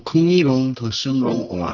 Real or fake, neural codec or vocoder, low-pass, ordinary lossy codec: fake; codec, 24 kHz, 0.9 kbps, WavTokenizer, medium music audio release; 7.2 kHz; none